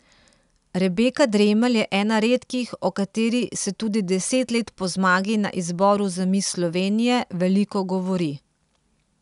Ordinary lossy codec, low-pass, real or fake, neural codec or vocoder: none; 10.8 kHz; real; none